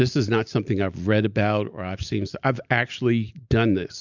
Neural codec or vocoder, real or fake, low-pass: none; real; 7.2 kHz